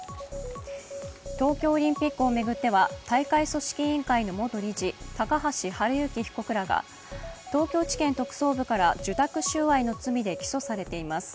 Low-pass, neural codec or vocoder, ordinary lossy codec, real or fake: none; none; none; real